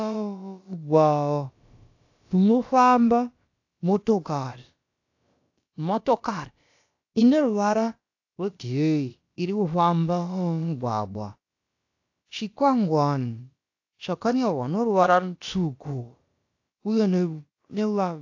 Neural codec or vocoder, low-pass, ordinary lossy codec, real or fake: codec, 16 kHz, about 1 kbps, DyCAST, with the encoder's durations; 7.2 kHz; AAC, 48 kbps; fake